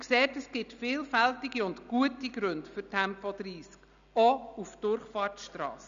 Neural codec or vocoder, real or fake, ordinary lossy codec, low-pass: none; real; none; 7.2 kHz